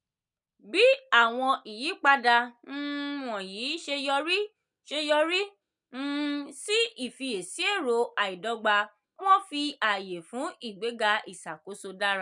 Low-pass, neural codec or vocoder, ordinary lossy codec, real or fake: 10.8 kHz; none; none; real